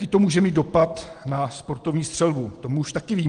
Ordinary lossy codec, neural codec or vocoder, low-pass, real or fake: Opus, 24 kbps; none; 9.9 kHz; real